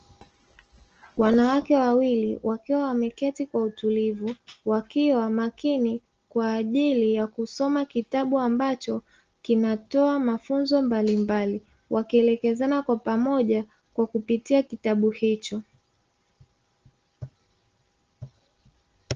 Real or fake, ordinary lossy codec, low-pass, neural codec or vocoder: real; Opus, 16 kbps; 7.2 kHz; none